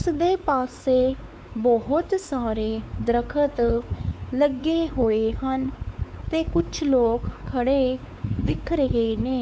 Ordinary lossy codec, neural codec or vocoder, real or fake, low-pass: none; codec, 16 kHz, 4 kbps, X-Codec, WavLM features, trained on Multilingual LibriSpeech; fake; none